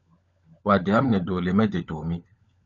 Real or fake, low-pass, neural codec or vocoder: fake; 7.2 kHz; codec, 16 kHz, 16 kbps, FunCodec, trained on LibriTTS, 50 frames a second